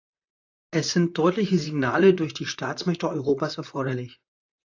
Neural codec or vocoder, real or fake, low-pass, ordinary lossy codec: vocoder, 44.1 kHz, 128 mel bands, Pupu-Vocoder; fake; 7.2 kHz; AAC, 48 kbps